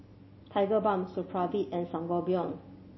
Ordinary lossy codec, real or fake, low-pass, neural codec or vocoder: MP3, 24 kbps; real; 7.2 kHz; none